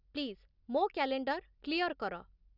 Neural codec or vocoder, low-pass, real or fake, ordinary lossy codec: none; 5.4 kHz; real; none